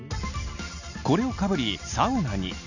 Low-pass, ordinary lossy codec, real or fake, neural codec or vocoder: 7.2 kHz; none; real; none